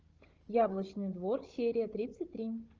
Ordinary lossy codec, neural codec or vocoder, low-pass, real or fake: Opus, 24 kbps; codec, 16 kHz, 16 kbps, FunCodec, trained on Chinese and English, 50 frames a second; 7.2 kHz; fake